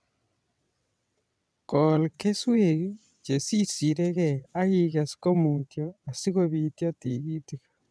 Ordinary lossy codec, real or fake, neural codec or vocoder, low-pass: none; fake; vocoder, 22.05 kHz, 80 mel bands, WaveNeXt; none